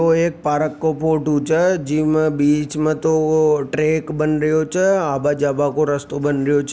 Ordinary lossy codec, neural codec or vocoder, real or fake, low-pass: none; none; real; none